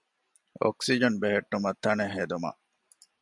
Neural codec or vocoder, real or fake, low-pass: none; real; 10.8 kHz